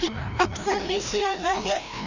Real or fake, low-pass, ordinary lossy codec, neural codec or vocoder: fake; 7.2 kHz; none; codec, 16 kHz, 1 kbps, FreqCodec, larger model